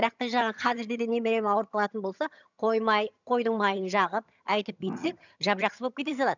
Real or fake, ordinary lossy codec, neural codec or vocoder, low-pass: fake; none; vocoder, 22.05 kHz, 80 mel bands, HiFi-GAN; 7.2 kHz